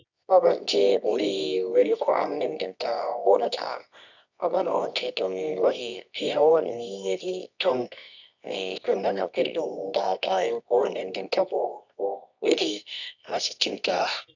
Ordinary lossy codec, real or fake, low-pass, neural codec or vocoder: none; fake; 7.2 kHz; codec, 24 kHz, 0.9 kbps, WavTokenizer, medium music audio release